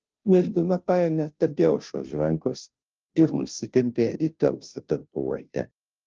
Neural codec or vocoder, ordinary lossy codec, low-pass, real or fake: codec, 16 kHz, 0.5 kbps, FunCodec, trained on Chinese and English, 25 frames a second; Opus, 24 kbps; 7.2 kHz; fake